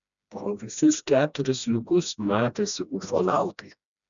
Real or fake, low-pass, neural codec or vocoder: fake; 7.2 kHz; codec, 16 kHz, 1 kbps, FreqCodec, smaller model